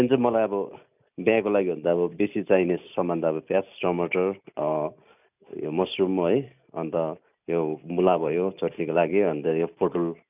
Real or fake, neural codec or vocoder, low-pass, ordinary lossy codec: real; none; 3.6 kHz; none